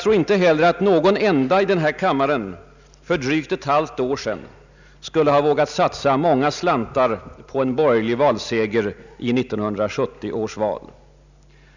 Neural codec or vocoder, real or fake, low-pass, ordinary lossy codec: none; real; 7.2 kHz; none